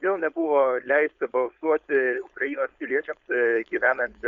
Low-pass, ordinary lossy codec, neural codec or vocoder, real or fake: 7.2 kHz; Opus, 64 kbps; codec, 16 kHz, 4.8 kbps, FACodec; fake